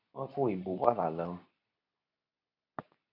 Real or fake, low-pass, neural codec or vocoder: fake; 5.4 kHz; codec, 24 kHz, 0.9 kbps, WavTokenizer, medium speech release version 2